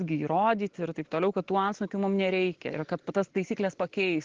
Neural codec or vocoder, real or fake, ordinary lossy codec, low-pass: none; real; Opus, 16 kbps; 7.2 kHz